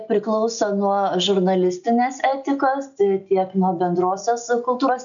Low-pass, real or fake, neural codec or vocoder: 7.2 kHz; real; none